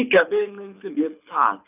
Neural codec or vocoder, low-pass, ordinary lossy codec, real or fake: codec, 24 kHz, 6 kbps, HILCodec; 3.6 kHz; none; fake